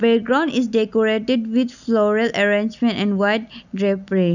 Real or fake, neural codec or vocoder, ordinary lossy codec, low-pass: real; none; none; 7.2 kHz